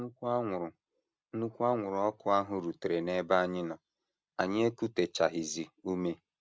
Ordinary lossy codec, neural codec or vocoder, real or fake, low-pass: none; none; real; none